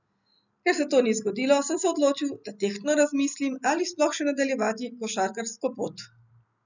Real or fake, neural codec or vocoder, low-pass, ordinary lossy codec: real; none; 7.2 kHz; none